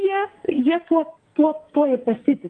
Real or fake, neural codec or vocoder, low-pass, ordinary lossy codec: fake; codec, 44.1 kHz, 2.6 kbps, SNAC; 10.8 kHz; Opus, 24 kbps